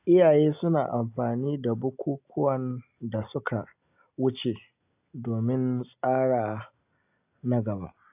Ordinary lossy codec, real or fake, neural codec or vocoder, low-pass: AAC, 32 kbps; real; none; 3.6 kHz